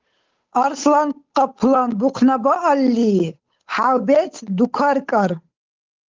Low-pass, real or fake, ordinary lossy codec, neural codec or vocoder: 7.2 kHz; fake; Opus, 32 kbps; codec, 16 kHz, 8 kbps, FunCodec, trained on Chinese and English, 25 frames a second